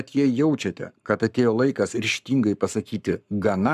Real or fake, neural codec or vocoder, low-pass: fake; codec, 44.1 kHz, 7.8 kbps, Pupu-Codec; 14.4 kHz